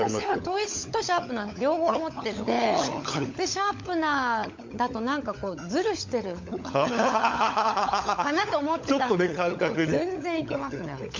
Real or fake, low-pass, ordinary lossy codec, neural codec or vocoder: fake; 7.2 kHz; MP3, 48 kbps; codec, 16 kHz, 16 kbps, FunCodec, trained on LibriTTS, 50 frames a second